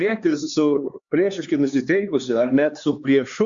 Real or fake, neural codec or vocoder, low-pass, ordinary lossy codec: fake; codec, 16 kHz, 2 kbps, X-Codec, HuBERT features, trained on LibriSpeech; 7.2 kHz; Opus, 64 kbps